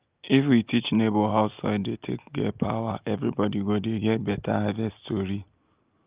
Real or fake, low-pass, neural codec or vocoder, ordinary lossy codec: real; 3.6 kHz; none; Opus, 32 kbps